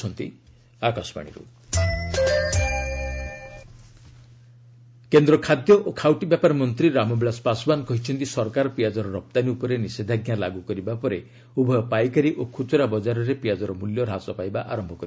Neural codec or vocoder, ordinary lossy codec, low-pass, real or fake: none; none; none; real